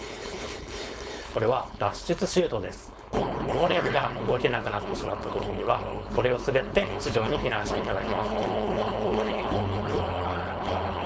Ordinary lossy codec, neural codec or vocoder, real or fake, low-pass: none; codec, 16 kHz, 4.8 kbps, FACodec; fake; none